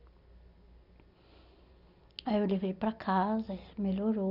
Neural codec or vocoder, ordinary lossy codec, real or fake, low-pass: none; none; real; 5.4 kHz